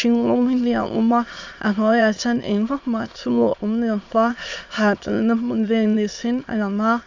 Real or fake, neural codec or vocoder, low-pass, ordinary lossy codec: fake; autoencoder, 22.05 kHz, a latent of 192 numbers a frame, VITS, trained on many speakers; 7.2 kHz; AAC, 48 kbps